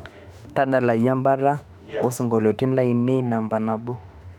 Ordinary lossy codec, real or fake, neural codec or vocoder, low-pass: none; fake; autoencoder, 48 kHz, 32 numbers a frame, DAC-VAE, trained on Japanese speech; 19.8 kHz